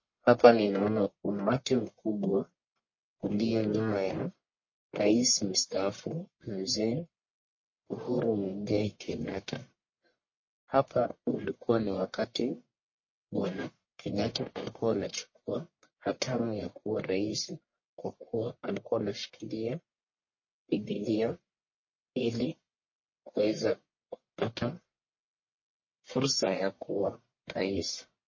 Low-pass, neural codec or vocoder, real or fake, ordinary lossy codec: 7.2 kHz; codec, 44.1 kHz, 1.7 kbps, Pupu-Codec; fake; MP3, 32 kbps